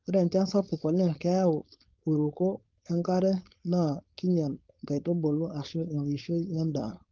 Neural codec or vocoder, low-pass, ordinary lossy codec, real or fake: codec, 16 kHz, 4.8 kbps, FACodec; 7.2 kHz; Opus, 32 kbps; fake